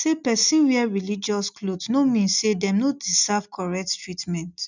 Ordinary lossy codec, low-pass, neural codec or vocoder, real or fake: none; 7.2 kHz; none; real